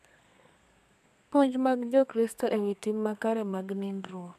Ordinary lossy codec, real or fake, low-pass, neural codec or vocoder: none; fake; 14.4 kHz; codec, 32 kHz, 1.9 kbps, SNAC